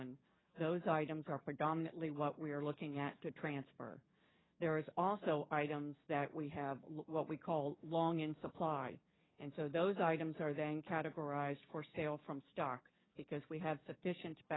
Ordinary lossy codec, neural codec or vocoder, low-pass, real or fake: AAC, 16 kbps; codec, 44.1 kHz, 7.8 kbps, DAC; 7.2 kHz; fake